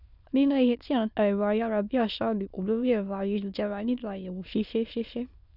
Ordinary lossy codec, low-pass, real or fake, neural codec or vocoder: none; 5.4 kHz; fake; autoencoder, 22.05 kHz, a latent of 192 numbers a frame, VITS, trained on many speakers